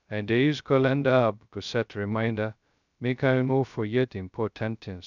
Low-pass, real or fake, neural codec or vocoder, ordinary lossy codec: 7.2 kHz; fake; codec, 16 kHz, 0.2 kbps, FocalCodec; none